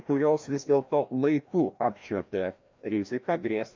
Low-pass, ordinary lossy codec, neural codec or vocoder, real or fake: 7.2 kHz; MP3, 64 kbps; codec, 16 kHz, 1 kbps, FreqCodec, larger model; fake